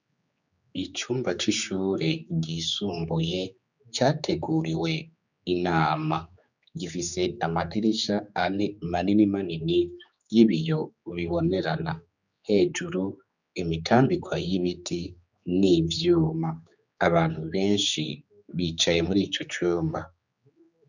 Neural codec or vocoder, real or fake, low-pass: codec, 16 kHz, 4 kbps, X-Codec, HuBERT features, trained on general audio; fake; 7.2 kHz